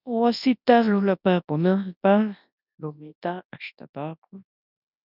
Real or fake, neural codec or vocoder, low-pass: fake; codec, 24 kHz, 0.9 kbps, WavTokenizer, large speech release; 5.4 kHz